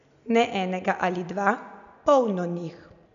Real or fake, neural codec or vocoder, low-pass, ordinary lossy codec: real; none; 7.2 kHz; none